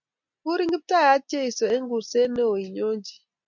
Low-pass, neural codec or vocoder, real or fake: 7.2 kHz; none; real